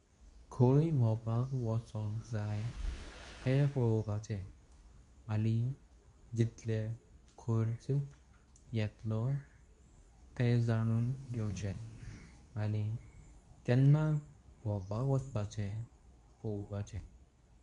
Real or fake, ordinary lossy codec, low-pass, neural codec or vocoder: fake; none; 10.8 kHz; codec, 24 kHz, 0.9 kbps, WavTokenizer, medium speech release version 2